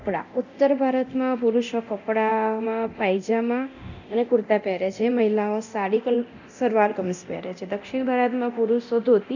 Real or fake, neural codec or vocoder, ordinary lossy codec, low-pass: fake; codec, 24 kHz, 0.9 kbps, DualCodec; none; 7.2 kHz